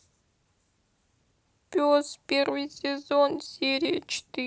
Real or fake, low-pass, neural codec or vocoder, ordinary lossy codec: real; none; none; none